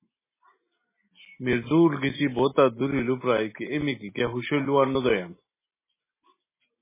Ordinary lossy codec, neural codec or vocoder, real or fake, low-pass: MP3, 16 kbps; none; real; 3.6 kHz